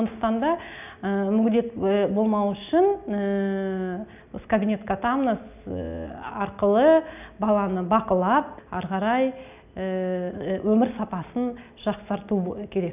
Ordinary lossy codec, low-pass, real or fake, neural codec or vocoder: none; 3.6 kHz; real; none